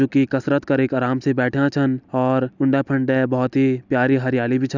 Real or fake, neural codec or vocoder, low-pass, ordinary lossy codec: real; none; 7.2 kHz; none